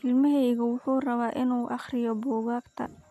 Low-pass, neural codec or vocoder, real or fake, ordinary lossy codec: 14.4 kHz; none; real; none